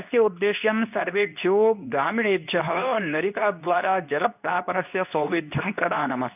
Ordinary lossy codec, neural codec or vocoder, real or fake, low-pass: none; codec, 24 kHz, 0.9 kbps, WavTokenizer, medium speech release version 2; fake; 3.6 kHz